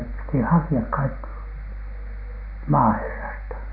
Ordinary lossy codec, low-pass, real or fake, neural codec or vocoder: none; 5.4 kHz; real; none